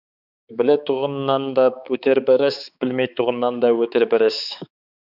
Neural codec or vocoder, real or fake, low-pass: codec, 16 kHz, 4 kbps, X-Codec, HuBERT features, trained on balanced general audio; fake; 5.4 kHz